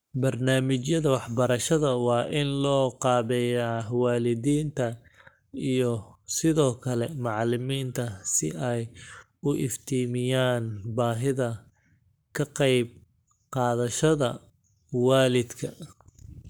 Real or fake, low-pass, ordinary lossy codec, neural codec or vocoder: fake; none; none; codec, 44.1 kHz, 7.8 kbps, Pupu-Codec